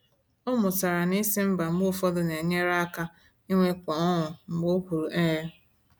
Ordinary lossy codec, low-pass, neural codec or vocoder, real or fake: none; none; none; real